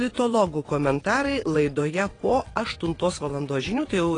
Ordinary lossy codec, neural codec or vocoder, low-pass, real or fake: AAC, 32 kbps; vocoder, 22.05 kHz, 80 mel bands, WaveNeXt; 9.9 kHz; fake